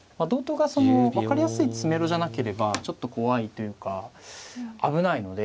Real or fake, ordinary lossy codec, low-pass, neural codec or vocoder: real; none; none; none